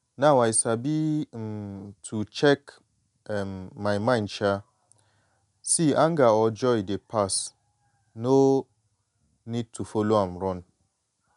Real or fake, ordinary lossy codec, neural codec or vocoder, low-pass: real; none; none; 10.8 kHz